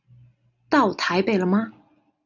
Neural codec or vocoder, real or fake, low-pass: none; real; 7.2 kHz